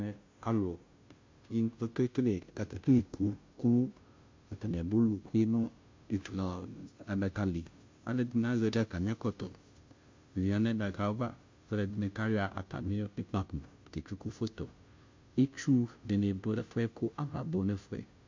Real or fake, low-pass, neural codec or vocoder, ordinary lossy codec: fake; 7.2 kHz; codec, 16 kHz, 0.5 kbps, FunCodec, trained on Chinese and English, 25 frames a second; MP3, 48 kbps